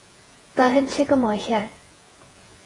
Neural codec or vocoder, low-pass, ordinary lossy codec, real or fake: vocoder, 48 kHz, 128 mel bands, Vocos; 10.8 kHz; AAC, 32 kbps; fake